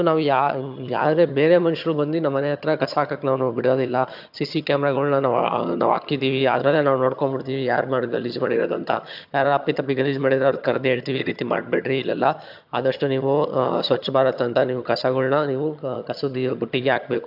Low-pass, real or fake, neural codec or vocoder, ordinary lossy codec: 5.4 kHz; fake; vocoder, 22.05 kHz, 80 mel bands, HiFi-GAN; AAC, 48 kbps